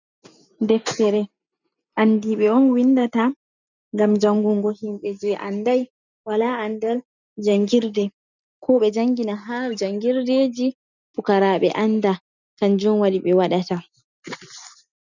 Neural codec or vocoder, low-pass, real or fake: none; 7.2 kHz; real